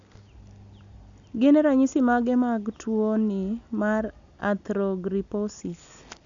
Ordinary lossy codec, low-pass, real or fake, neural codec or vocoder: none; 7.2 kHz; real; none